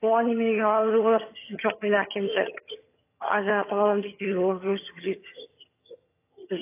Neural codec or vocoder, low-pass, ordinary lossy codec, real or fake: vocoder, 22.05 kHz, 80 mel bands, HiFi-GAN; 3.6 kHz; AAC, 24 kbps; fake